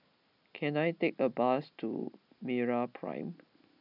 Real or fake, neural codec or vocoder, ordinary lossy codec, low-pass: real; none; none; 5.4 kHz